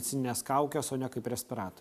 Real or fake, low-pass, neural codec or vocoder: real; 14.4 kHz; none